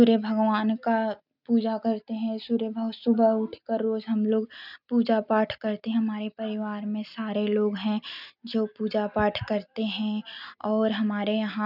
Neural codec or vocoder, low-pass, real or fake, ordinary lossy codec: none; 5.4 kHz; real; none